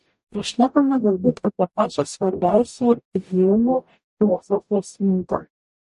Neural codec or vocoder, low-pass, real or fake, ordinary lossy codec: codec, 44.1 kHz, 0.9 kbps, DAC; 14.4 kHz; fake; MP3, 48 kbps